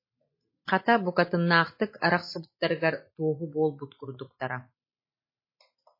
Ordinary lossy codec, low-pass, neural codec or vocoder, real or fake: MP3, 24 kbps; 5.4 kHz; none; real